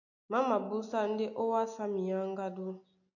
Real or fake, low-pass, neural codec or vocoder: real; 7.2 kHz; none